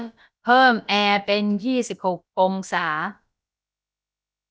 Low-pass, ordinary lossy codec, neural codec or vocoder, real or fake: none; none; codec, 16 kHz, about 1 kbps, DyCAST, with the encoder's durations; fake